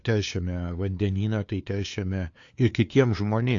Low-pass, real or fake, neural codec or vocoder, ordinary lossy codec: 7.2 kHz; fake; codec, 16 kHz, 2 kbps, FunCodec, trained on LibriTTS, 25 frames a second; AAC, 48 kbps